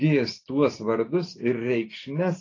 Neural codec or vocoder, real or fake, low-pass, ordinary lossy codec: none; real; 7.2 kHz; AAC, 32 kbps